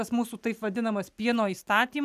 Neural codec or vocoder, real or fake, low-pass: none; real; 14.4 kHz